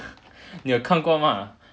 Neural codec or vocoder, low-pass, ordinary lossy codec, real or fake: none; none; none; real